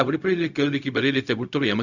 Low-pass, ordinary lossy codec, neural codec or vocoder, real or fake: 7.2 kHz; none; codec, 16 kHz, 0.4 kbps, LongCat-Audio-Codec; fake